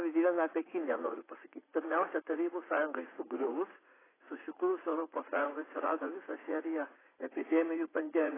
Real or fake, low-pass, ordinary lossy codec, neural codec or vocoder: fake; 3.6 kHz; AAC, 16 kbps; vocoder, 44.1 kHz, 80 mel bands, Vocos